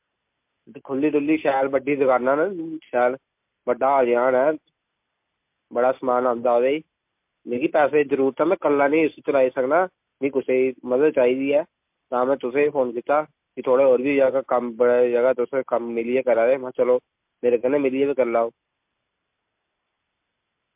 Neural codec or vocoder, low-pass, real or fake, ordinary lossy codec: none; 3.6 kHz; real; MP3, 32 kbps